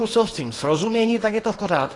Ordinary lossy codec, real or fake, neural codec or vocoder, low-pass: AAC, 32 kbps; fake; codec, 24 kHz, 0.9 kbps, WavTokenizer, small release; 10.8 kHz